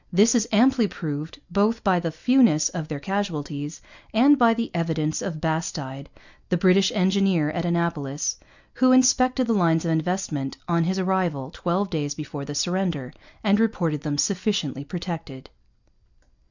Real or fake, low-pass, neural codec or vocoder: real; 7.2 kHz; none